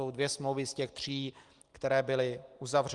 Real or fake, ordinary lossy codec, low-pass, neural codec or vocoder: real; Opus, 24 kbps; 10.8 kHz; none